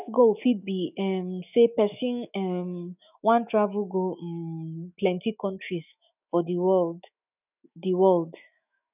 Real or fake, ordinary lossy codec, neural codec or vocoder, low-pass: fake; none; autoencoder, 48 kHz, 128 numbers a frame, DAC-VAE, trained on Japanese speech; 3.6 kHz